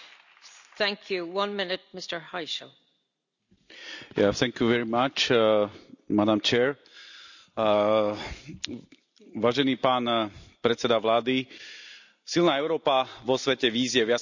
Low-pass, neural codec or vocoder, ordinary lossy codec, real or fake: 7.2 kHz; none; none; real